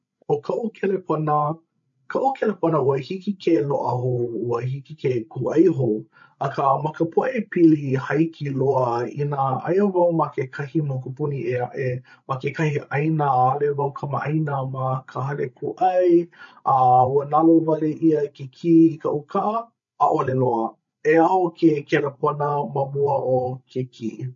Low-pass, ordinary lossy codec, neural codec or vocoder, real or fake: 7.2 kHz; MP3, 48 kbps; codec, 16 kHz, 16 kbps, FreqCodec, larger model; fake